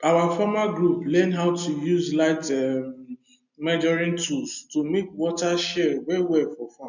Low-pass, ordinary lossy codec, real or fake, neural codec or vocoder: 7.2 kHz; none; real; none